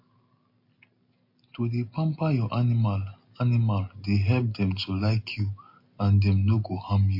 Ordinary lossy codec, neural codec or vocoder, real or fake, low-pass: MP3, 32 kbps; none; real; 5.4 kHz